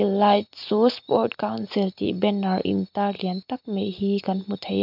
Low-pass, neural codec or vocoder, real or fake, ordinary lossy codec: 5.4 kHz; none; real; MP3, 48 kbps